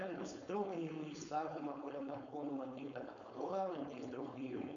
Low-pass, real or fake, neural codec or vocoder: 7.2 kHz; fake; codec, 16 kHz, 4.8 kbps, FACodec